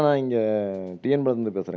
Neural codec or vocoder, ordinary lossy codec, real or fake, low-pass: none; none; real; none